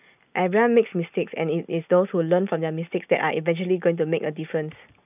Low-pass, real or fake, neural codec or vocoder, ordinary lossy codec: 3.6 kHz; real; none; none